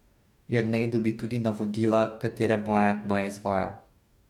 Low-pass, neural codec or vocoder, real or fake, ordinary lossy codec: 19.8 kHz; codec, 44.1 kHz, 2.6 kbps, DAC; fake; none